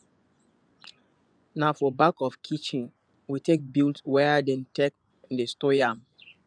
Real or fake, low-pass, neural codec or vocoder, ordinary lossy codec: fake; 9.9 kHz; vocoder, 24 kHz, 100 mel bands, Vocos; none